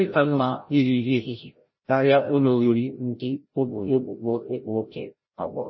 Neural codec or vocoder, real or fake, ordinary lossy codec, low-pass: codec, 16 kHz, 0.5 kbps, FreqCodec, larger model; fake; MP3, 24 kbps; 7.2 kHz